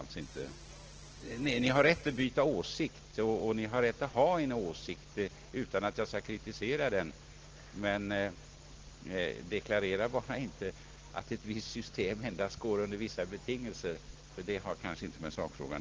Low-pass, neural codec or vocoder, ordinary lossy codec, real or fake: 7.2 kHz; none; Opus, 16 kbps; real